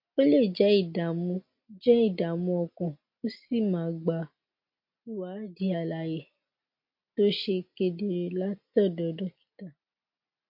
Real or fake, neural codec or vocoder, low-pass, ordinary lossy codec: real; none; 5.4 kHz; MP3, 32 kbps